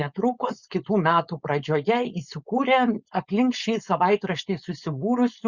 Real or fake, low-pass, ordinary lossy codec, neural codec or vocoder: fake; 7.2 kHz; Opus, 64 kbps; codec, 16 kHz, 4.8 kbps, FACodec